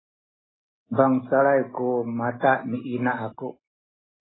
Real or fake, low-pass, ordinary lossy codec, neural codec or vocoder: real; 7.2 kHz; AAC, 16 kbps; none